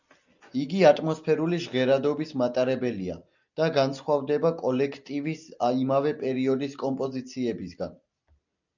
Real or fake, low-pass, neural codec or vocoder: real; 7.2 kHz; none